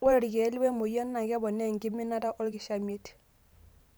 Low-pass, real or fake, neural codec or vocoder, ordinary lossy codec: none; fake; vocoder, 44.1 kHz, 128 mel bands every 512 samples, BigVGAN v2; none